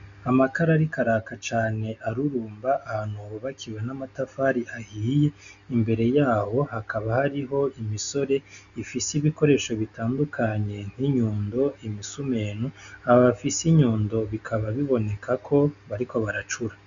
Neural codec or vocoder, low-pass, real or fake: none; 7.2 kHz; real